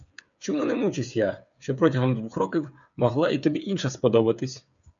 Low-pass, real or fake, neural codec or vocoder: 7.2 kHz; fake; codec, 16 kHz, 8 kbps, FreqCodec, smaller model